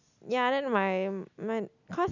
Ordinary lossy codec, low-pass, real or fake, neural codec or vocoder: none; 7.2 kHz; real; none